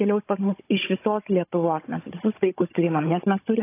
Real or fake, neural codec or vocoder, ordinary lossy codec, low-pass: fake; codec, 16 kHz, 16 kbps, FunCodec, trained on Chinese and English, 50 frames a second; AAC, 24 kbps; 3.6 kHz